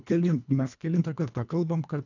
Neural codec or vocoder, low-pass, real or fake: codec, 24 kHz, 1.5 kbps, HILCodec; 7.2 kHz; fake